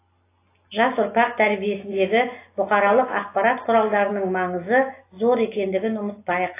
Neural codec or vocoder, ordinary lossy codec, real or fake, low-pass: none; AAC, 24 kbps; real; 3.6 kHz